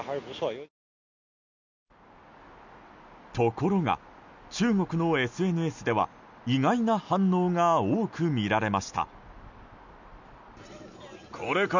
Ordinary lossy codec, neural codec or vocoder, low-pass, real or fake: none; none; 7.2 kHz; real